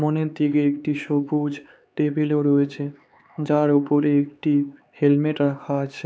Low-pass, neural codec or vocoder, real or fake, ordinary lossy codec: none; codec, 16 kHz, 4 kbps, X-Codec, HuBERT features, trained on LibriSpeech; fake; none